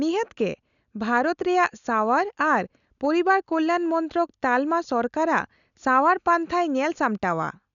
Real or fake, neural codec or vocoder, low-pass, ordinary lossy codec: real; none; 7.2 kHz; none